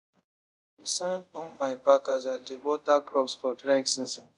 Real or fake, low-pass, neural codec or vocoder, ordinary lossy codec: fake; 10.8 kHz; codec, 24 kHz, 0.5 kbps, DualCodec; none